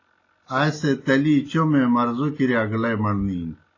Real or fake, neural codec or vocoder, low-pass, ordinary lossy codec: real; none; 7.2 kHz; AAC, 32 kbps